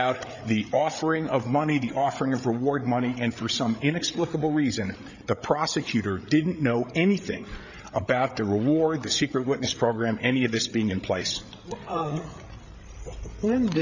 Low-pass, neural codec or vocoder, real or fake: 7.2 kHz; codec, 16 kHz, 8 kbps, FreqCodec, larger model; fake